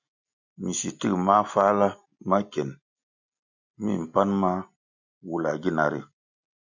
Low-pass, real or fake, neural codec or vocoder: 7.2 kHz; real; none